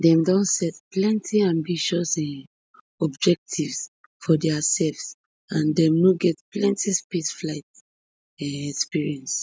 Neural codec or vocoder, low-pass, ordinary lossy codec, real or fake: none; none; none; real